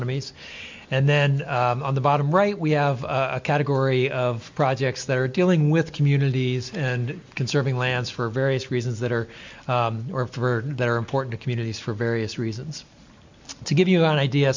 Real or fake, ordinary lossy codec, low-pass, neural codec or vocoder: real; MP3, 64 kbps; 7.2 kHz; none